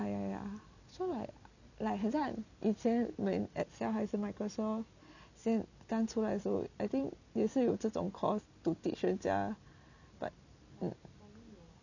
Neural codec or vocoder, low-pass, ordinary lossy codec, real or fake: none; 7.2 kHz; none; real